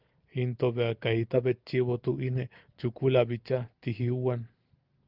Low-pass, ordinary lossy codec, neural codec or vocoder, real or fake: 5.4 kHz; Opus, 32 kbps; none; real